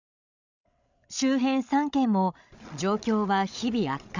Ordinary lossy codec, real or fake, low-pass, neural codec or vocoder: none; fake; 7.2 kHz; codec, 16 kHz, 16 kbps, FreqCodec, larger model